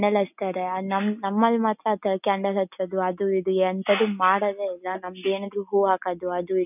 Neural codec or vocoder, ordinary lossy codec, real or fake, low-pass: none; none; real; 3.6 kHz